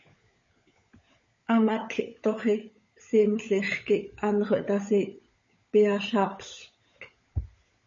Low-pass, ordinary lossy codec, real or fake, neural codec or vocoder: 7.2 kHz; MP3, 32 kbps; fake; codec, 16 kHz, 16 kbps, FunCodec, trained on LibriTTS, 50 frames a second